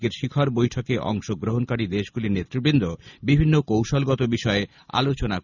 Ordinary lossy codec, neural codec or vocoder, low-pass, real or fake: none; none; 7.2 kHz; real